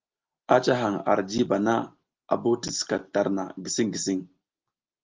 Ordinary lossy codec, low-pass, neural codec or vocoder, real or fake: Opus, 32 kbps; 7.2 kHz; none; real